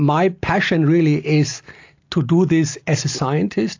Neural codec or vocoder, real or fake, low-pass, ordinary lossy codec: none; real; 7.2 kHz; AAC, 48 kbps